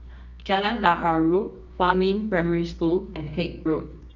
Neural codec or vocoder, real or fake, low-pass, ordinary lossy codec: codec, 24 kHz, 0.9 kbps, WavTokenizer, medium music audio release; fake; 7.2 kHz; none